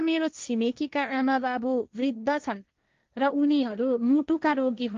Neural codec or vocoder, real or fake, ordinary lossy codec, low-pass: codec, 16 kHz, 1.1 kbps, Voila-Tokenizer; fake; Opus, 32 kbps; 7.2 kHz